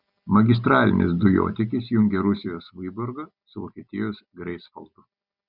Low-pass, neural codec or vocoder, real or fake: 5.4 kHz; none; real